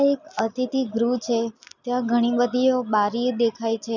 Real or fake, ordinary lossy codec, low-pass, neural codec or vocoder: real; none; 7.2 kHz; none